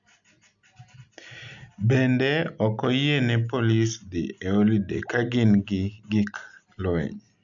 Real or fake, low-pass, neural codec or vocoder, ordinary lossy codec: real; 7.2 kHz; none; none